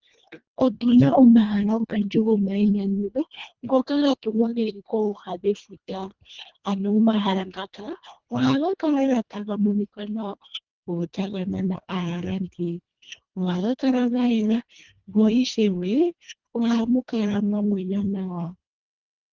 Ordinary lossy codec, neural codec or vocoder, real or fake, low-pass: Opus, 32 kbps; codec, 24 kHz, 1.5 kbps, HILCodec; fake; 7.2 kHz